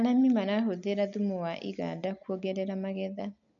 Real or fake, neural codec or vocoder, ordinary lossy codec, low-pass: real; none; none; 7.2 kHz